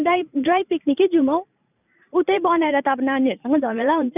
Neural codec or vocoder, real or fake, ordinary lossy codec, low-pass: vocoder, 44.1 kHz, 128 mel bands every 512 samples, BigVGAN v2; fake; none; 3.6 kHz